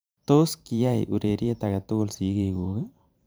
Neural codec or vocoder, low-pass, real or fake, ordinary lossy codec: vocoder, 44.1 kHz, 128 mel bands every 512 samples, BigVGAN v2; none; fake; none